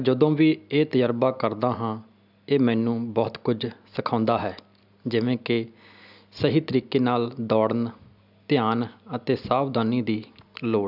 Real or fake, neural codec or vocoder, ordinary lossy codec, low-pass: real; none; none; 5.4 kHz